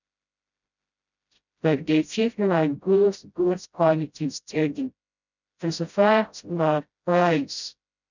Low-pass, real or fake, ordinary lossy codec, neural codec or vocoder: 7.2 kHz; fake; none; codec, 16 kHz, 0.5 kbps, FreqCodec, smaller model